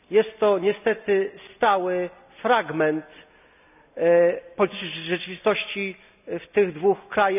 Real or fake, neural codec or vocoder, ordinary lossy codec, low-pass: real; none; none; 3.6 kHz